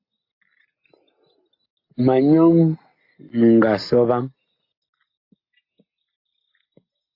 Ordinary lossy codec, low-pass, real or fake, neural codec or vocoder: AAC, 32 kbps; 5.4 kHz; real; none